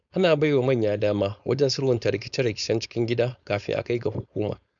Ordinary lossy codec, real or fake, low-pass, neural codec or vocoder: none; fake; 7.2 kHz; codec, 16 kHz, 4.8 kbps, FACodec